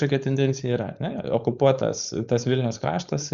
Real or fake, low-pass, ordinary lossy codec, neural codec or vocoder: fake; 7.2 kHz; Opus, 64 kbps; codec, 16 kHz, 4.8 kbps, FACodec